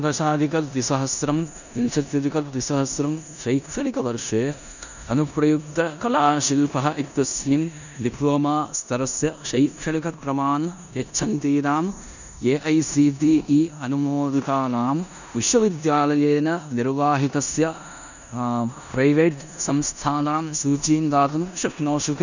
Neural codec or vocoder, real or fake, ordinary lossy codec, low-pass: codec, 16 kHz in and 24 kHz out, 0.9 kbps, LongCat-Audio-Codec, four codebook decoder; fake; none; 7.2 kHz